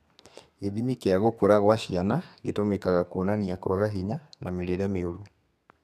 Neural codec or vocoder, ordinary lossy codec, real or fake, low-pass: codec, 32 kHz, 1.9 kbps, SNAC; none; fake; 14.4 kHz